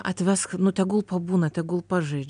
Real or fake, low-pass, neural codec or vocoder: real; 9.9 kHz; none